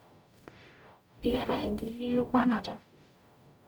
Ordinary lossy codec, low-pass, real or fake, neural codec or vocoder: none; none; fake; codec, 44.1 kHz, 0.9 kbps, DAC